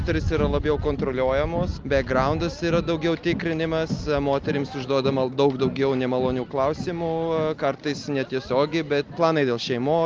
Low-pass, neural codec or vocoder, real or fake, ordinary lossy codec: 7.2 kHz; none; real; Opus, 24 kbps